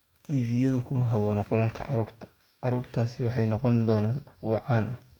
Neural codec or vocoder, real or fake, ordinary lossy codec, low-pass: codec, 44.1 kHz, 2.6 kbps, DAC; fake; none; 19.8 kHz